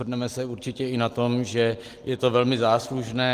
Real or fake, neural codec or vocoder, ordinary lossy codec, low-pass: real; none; Opus, 24 kbps; 14.4 kHz